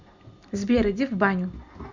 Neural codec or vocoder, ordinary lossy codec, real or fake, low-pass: none; Opus, 64 kbps; real; 7.2 kHz